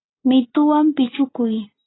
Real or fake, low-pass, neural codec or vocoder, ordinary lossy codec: real; 7.2 kHz; none; AAC, 16 kbps